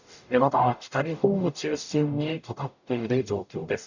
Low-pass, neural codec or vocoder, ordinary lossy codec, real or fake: 7.2 kHz; codec, 44.1 kHz, 0.9 kbps, DAC; none; fake